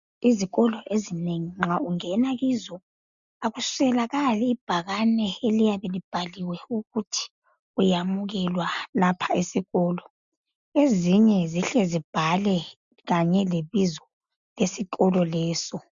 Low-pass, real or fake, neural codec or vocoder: 7.2 kHz; real; none